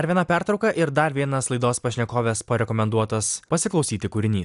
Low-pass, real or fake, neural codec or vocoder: 10.8 kHz; real; none